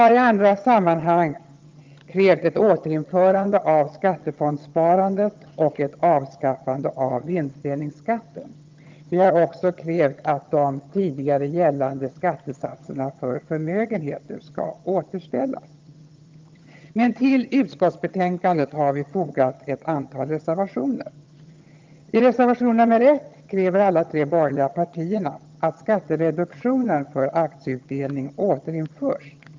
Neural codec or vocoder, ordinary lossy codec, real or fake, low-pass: vocoder, 22.05 kHz, 80 mel bands, HiFi-GAN; Opus, 32 kbps; fake; 7.2 kHz